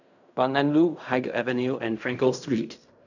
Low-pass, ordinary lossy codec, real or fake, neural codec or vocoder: 7.2 kHz; none; fake; codec, 16 kHz in and 24 kHz out, 0.4 kbps, LongCat-Audio-Codec, fine tuned four codebook decoder